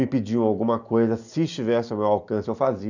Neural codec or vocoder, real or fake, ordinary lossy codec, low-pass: none; real; none; 7.2 kHz